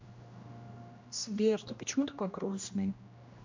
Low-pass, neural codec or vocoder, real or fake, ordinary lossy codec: 7.2 kHz; codec, 16 kHz, 1 kbps, X-Codec, HuBERT features, trained on general audio; fake; MP3, 48 kbps